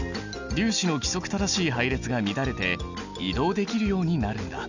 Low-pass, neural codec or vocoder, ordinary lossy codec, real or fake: 7.2 kHz; none; none; real